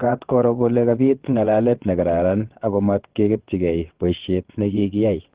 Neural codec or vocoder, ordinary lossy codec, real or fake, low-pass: vocoder, 22.05 kHz, 80 mel bands, WaveNeXt; Opus, 16 kbps; fake; 3.6 kHz